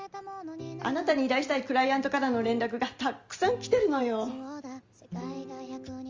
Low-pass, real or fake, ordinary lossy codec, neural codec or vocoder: 7.2 kHz; real; Opus, 32 kbps; none